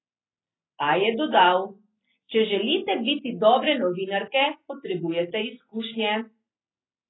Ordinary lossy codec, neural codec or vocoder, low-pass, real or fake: AAC, 16 kbps; none; 7.2 kHz; real